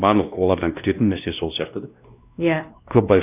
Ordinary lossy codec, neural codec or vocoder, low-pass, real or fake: none; codec, 16 kHz, 1 kbps, X-Codec, WavLM features, trained on Multilingual LibriSpeech; 3.6 kHz; fake